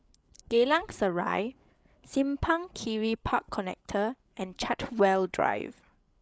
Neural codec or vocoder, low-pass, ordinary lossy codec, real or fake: codec, 16 kHz, 8 kbps, FreqCodec, larger model; none; none; fake